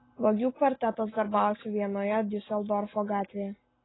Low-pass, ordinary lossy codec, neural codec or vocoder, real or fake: 7.2 kHz; AAC, 16 kbps; none; real